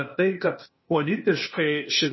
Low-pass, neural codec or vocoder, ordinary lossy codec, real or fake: 7.2 kHz; codec, 16 kHz, 0.8 kbps, ZipCodec; MP3, 24 kbps; fake